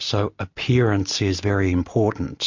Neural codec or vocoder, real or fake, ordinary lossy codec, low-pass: none; real; MP3, 48 kbps; 7.2 kHz